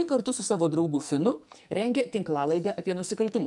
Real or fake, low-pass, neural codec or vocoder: fake; 10.8 kHz; codec, 44.1 kHz, 2.6 kbps, SNAC